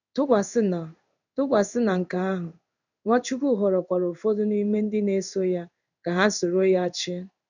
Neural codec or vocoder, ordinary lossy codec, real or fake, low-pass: codec, 16 kHz in and 24 kHz out, 1 kbps, XY-Tokenizer; none; fake; 7.2 kHz